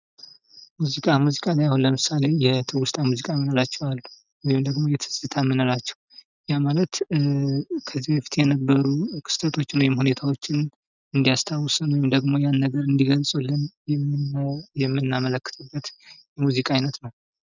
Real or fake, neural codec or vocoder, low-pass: real; none; 7.2 kHz